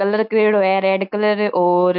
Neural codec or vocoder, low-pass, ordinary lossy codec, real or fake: none; 5.4 kHz; none; real